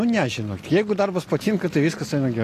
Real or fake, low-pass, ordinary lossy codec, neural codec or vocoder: real; 14.4 kHz; AAC, 48 kbps; none